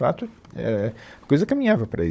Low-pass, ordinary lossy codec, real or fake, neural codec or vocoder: none; none; fake; codec, 16 kHz, 16 kbps, FunCodec, trained on Chinese and English, 50 frames a second